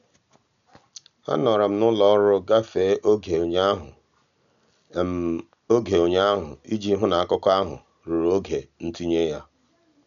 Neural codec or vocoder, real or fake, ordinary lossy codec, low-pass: none; real; none; 7.2 kHz